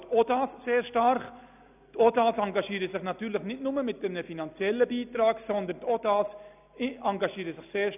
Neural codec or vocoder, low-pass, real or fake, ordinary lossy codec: none; 3.6 kHz; real; none